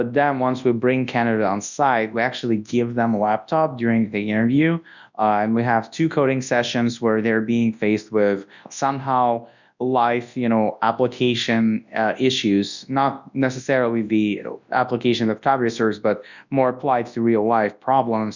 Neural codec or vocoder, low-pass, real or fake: codec, 24 kHz, 0.9 kbps, WavTokenizer, large speech release; 7.2 kHz; fake